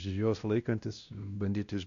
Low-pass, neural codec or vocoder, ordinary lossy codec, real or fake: 7.2 kHz; codec, 16 kHz, 1 kbps, X-Codec, WavLM features, trained on Multilingual LibriSpeech; Opus, 64 kbps; fake